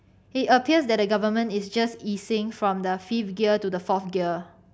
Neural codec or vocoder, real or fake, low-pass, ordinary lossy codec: none; real; none; none